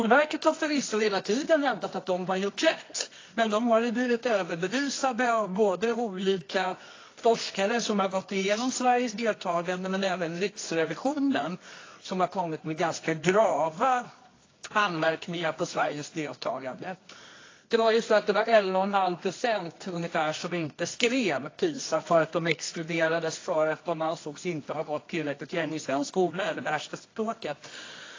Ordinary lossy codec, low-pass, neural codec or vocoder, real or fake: AAC, 32 kbps; 7.2 kHz; codec, 24 kHz, 0.9 kbps, WavTokenizer, medium music audio release; fake